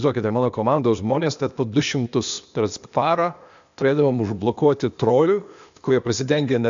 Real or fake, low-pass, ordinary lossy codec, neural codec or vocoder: fake; 7.2 kHz; MP3, 64 kbps; codec, 16 kHz, 0.8 kbps, ZipCodec